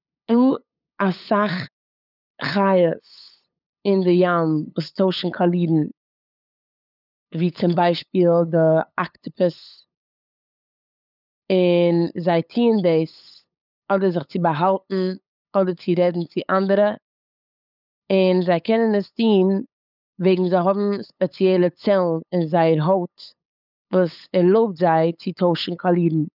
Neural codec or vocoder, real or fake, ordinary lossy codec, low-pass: codec, 16 kHz, 8 kbps, FunCodec, trained on LibriTTS, 25 frames a second; fake; none; 5.4 kHz